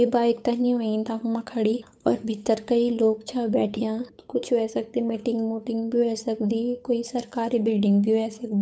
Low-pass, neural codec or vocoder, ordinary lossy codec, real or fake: none; codec, 16 kHz, 8 kbps, FunCodec, trained on Chinese and English, 25 frames a second; none; fake